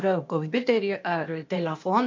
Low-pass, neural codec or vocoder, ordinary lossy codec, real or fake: 7.2 kHz; codec, 16 kHz, 0.8 kbps, ZipCodec; MP3, 48 kbps; fake